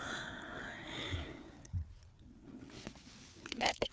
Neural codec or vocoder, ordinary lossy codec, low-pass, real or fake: codec, 16 kHz, 4 kbps, FreqCodec, larger model; none; none; fake